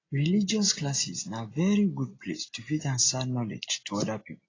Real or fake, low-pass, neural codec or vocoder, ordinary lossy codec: real; 7.2 kHz; none; AAC, 32 kbps